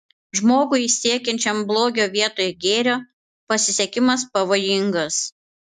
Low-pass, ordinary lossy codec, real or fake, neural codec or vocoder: 14.4 kHz; AAC, 96 kbps; real; none